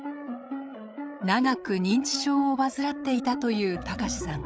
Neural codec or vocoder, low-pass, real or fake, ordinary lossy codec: codec, 16 kHz, 8 kbps, FreqCodec, larger model; none; fake; none